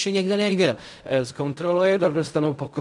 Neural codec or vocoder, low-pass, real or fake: codec, 16 kHz in and 24 kHz out, 0.4 kbps, LongCat-Audio-Codec, fine tuned four codebook decoder; 10.8 kHz; fake